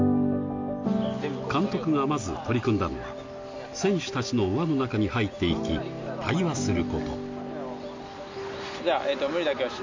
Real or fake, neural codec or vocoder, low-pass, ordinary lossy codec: real; none; 7.2 kHz; MP3, 48 kbps